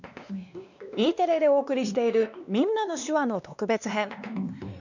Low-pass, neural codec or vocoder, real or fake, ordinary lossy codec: 7.2 kHz; codec, 16 kHz, 2 kbps, X-Codec, WavLM features, trained on Multilingual LibriSpeech; fake; none